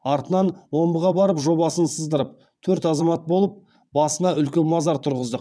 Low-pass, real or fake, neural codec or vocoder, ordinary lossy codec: none; fake; vocoder, 22.05 kHz, 80 mel bands, WaveNeXt; none